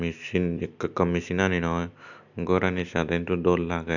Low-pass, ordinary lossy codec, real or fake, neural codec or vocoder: 7.2 kHz; none; real; none